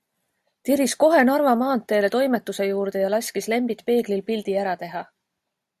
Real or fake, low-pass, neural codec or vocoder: real; 14.4 kHz; none